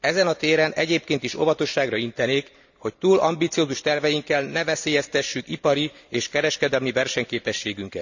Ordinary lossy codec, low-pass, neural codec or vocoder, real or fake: none; 7.2 kHz; none; real